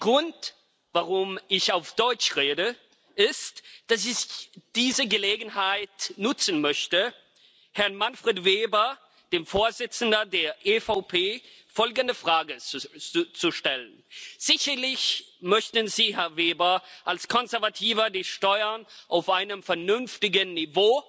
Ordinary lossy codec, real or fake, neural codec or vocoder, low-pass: none; real; none; none